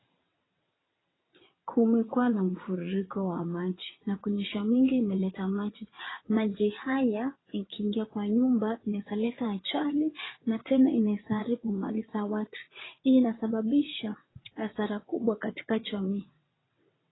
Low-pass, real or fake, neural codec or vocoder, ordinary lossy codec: 7.2 kHz; fake; vocoder, 22.05 kHz, 80 mel bands, WaveNeXt; AAC, 16 kbps